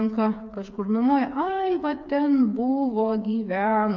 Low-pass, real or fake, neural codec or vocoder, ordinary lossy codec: 7.2 kHz; fake; codec, 16 kHz, 4 kbps, FreqCodec, smaller model; MP3, 64 kbps